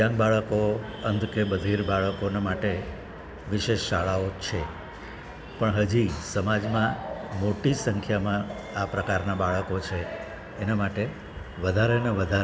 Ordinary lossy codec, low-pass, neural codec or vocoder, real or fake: none; none; none; real